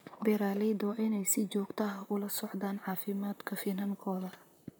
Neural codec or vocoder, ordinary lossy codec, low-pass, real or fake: vocoder, 44.1 kHz, 128 mel bands, Pupu-Vocoder; none; none; fake